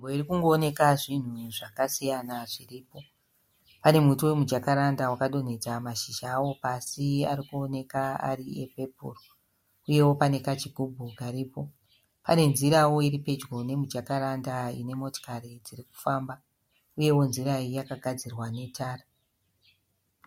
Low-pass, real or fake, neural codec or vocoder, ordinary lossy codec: 19.8 kHz; real; none; MP3, 64 kbps